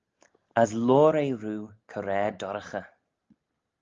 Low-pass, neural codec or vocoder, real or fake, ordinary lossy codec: 7.2 kHz; none; real; Opus, 32 kbps